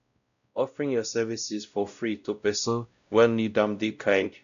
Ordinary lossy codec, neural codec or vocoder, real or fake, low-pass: none; codec, 16 kHz, 0.5 kbps, X-Codec, WavLM features, trained on Multilingual LibriSpeech; fake; 7.2 kHz